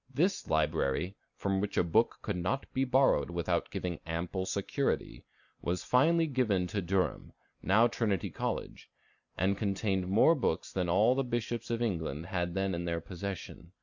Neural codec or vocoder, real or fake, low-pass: none; real; 7.2 kHz